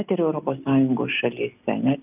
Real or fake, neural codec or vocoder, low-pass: real; none; 3.6 kHz